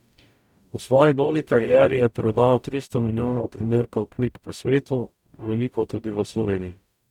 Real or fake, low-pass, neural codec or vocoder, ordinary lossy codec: fake; 19.8 kHz; codec, 44.1 kHz, 0.9 kbps, DAC; none